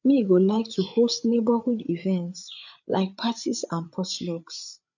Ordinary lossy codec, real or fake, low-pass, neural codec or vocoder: none; fake; 7.2 kHz; codec, 16 kHz, 8 kbps, FreqCodec, larger model